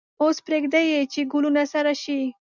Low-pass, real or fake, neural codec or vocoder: 7.2 kHz; real; none